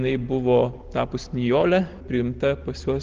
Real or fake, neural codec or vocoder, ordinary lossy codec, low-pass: real; none; Opus, 16 kbps; 7.2 kHz